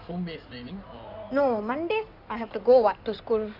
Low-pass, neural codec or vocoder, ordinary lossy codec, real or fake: 5.4 kHz; codec, 16 kHz in and 24 kHz out, 2.2 kbps, FireRedTTS-2 codec; none; fake